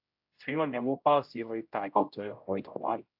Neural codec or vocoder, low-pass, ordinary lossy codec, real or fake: codec, 16 kHz, 0.5 kbps, X-Codec, HuBERT features, trained on general audio; 5.4 kHz; AAC, 48 kbps; fake